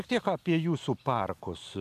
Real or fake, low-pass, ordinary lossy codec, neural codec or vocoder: real; 14.4 kHz; AAC, 96 kbps; none